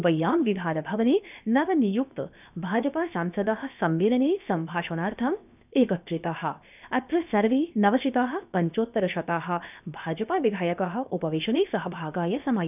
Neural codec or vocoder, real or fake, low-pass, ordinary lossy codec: codec, 16 kHz, 0.7 kbps, FocalCodec; fake; 3.6 kHz; none